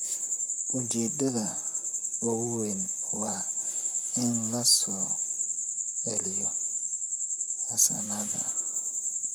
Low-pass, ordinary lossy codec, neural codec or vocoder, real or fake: none; none; vocoder, 44.1 kHz, 128 mel bands, Pupu-Vocoder; fake